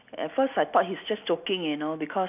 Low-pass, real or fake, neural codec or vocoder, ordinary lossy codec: 3.6 kHz; real; none; none